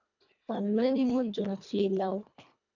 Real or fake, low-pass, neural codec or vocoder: fake; 7.2 kHz; codec, 24 kHz, 1.5 kbps, HILCodec